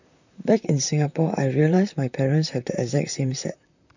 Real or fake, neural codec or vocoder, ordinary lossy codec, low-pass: fake; vocoder, 44.1 kHz, 128 mel bands, Pupu-Vocoder; none; 7.2 kHz